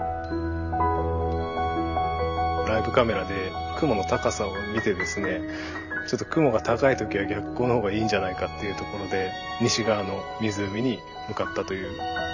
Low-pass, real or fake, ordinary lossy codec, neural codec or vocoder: 7.2 kHz; fake; none; vocoder, 44.1 kHz, 128 mel bands every 512 samples, BigVGAN v2